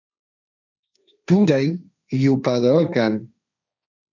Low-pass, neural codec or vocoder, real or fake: 7.2 kHz; codec, 16 kHz, 1.1 kbps, Voila-Tokenizer; fake